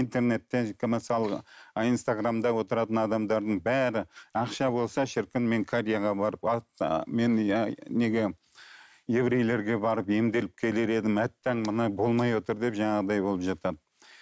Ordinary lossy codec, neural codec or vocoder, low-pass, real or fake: none; none; none; real